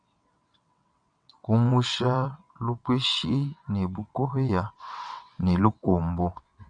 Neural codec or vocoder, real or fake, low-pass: vocoder, 22.05 kHz, 80 mel bands, WaveNeXt; fake; 9.9 kHz